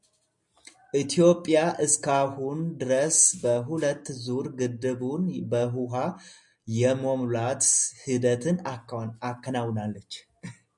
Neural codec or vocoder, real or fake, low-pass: none; real; 10.8 kHz